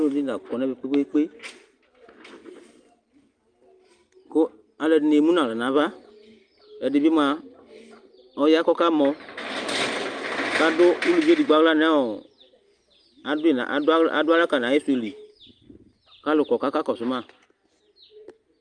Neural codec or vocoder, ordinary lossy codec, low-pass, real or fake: none; Opus, 32 kbps; 9.9 kHz; real